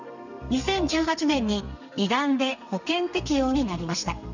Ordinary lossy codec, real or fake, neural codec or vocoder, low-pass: none; fake; codec, 32 kHz, 1.9 kbps, SNAC; 7.2 kHz